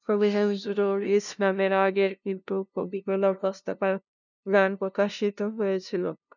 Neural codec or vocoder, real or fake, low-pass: codec, 16 kHz, 0.5 kbps, FunCodec, trained on LibriTTS, 25 frames a second; fake; 7.2 kHz